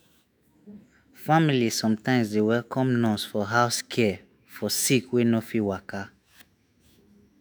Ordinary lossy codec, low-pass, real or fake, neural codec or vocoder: none; none; fake; autoencoder, 48 kHz, 128 numbers a frame, DAC-VAE, trained on Japanese speech